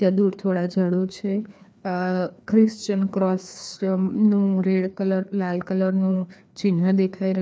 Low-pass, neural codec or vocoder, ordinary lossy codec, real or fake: none; codec, 16 kHz, 2 kbps, FreqCodec, larger model; none; fake